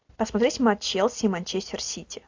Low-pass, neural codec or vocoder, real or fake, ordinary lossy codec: 7.2 kHz; none; real; AAC, 48 kbps